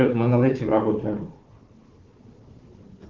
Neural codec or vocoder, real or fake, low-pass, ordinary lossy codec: codec, 16 kHz, 4 kbps, FunCodec, trained on Chinese and English, 50 frames a second; fake; 7.2 kHz; Opus, 16 kbps